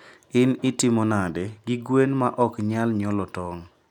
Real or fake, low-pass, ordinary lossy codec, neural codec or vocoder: real; 19.8 kHz; none; none